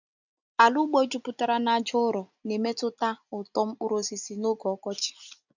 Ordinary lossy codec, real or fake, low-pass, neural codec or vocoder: none; real; 7.2 kHz; none